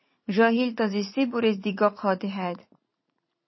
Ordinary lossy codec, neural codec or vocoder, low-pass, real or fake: MP3, 24 kbps; vocoder, 44.1 kHz, 80 mel bands, Vocos; 7.2 kHz; fake